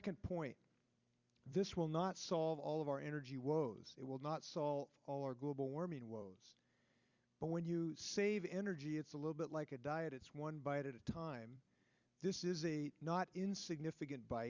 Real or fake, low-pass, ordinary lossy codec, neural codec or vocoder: fake; 7.2 kHz; Opus, 64 kbps; vocoder, 44.1 kHz, 128 mel bands every 256 samples, BigVGAN v2